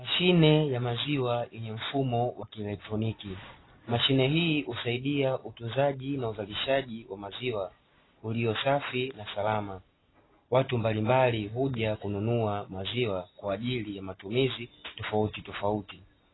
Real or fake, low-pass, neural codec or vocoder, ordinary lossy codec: real; 7.2 kHz; none; AAC, 16 kbps